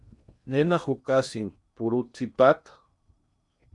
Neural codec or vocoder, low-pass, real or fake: codec, 16 kHz in and 24 kHz out, 0.8 kbps, FocalCodec, streaming, 65536 codes; 10.8 kHz; fake